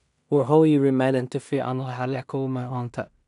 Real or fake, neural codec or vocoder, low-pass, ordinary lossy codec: fake; codec, 16 kHz in and 24 kHz out, 0.4 kbps, LongCat-Audio-Codec, two codebook decoder; 10.8 kHz; none